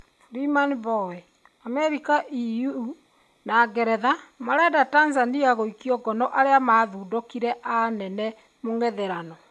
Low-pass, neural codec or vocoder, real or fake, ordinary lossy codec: none; none; real; none